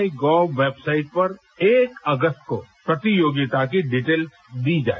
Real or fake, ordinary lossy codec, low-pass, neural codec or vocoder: real; none; none; none